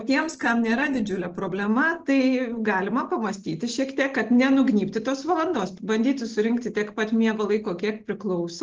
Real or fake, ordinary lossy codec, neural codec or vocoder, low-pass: real; Opus, 16 kbps; none; 7.2 kHz